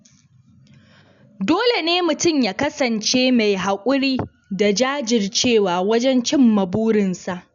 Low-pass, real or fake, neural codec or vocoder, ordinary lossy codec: 7.2 kHz; real; none; none